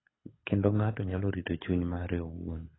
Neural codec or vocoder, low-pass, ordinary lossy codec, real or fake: codec, 24 kHz, 6 kbps, HILCodec; 7.2 kHz; AAC, 16 kbps; fake